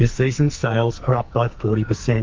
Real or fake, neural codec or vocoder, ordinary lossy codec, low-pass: fake; codec, 44.1 kHz, 2.6 kbps, SNAC; Opus, 32 kbps; 7.2 kHz